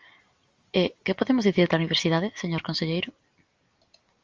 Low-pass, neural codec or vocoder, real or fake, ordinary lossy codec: 7.2 kHz; none; real; Opus, 32 kbps